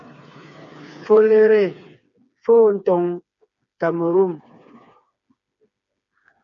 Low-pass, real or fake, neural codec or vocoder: 7.2 kHz; fake; codec, 16 kHz, 4 kbps, FreqCodec, smaller model